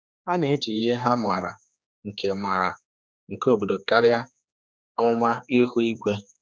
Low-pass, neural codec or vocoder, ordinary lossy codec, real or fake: none; codec, 16 kHz, 2 kbps, X-Codec, HuBERT features, trained on general audio; none; fake